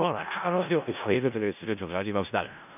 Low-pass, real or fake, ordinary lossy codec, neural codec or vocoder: 3.6 kHz; fake; none; codec, 16 kHz in and 24 kHz out, 0.4 kbps, LongCat-Audio-Codec, four codebook decoder